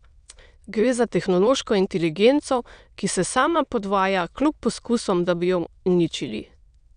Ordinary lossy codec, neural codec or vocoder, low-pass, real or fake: none; autoencoder, 22.05 kHz, a latent of 192 numbers a frame, VITS, trained on many speakers; 9.9 kHz; fake